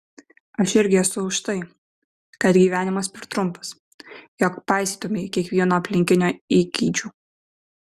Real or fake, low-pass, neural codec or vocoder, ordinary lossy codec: real; 14.4 kHz; none; Opus, 64 kbps